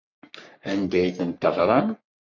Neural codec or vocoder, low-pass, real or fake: codec, 44.1 kHz, 3.4 kbps, Pupu-Codec; 7.2 kHz; fake